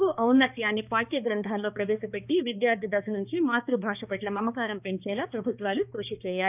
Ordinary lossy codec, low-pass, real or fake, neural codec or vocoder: none; 3.6 kHz; fake; codec, 16 kHz, 2 kbps, X-Codec, HuBERT features, trained on balanced general audio